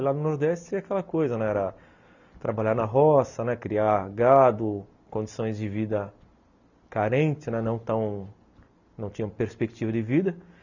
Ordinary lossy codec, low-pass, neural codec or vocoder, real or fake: none; 7.2 kHz; none; real